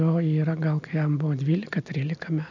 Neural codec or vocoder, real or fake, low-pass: none; real; 7.2 kHz